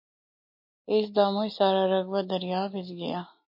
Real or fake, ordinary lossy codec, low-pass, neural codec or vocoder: real; MP3, 32 kbps; 5.4 kHz; none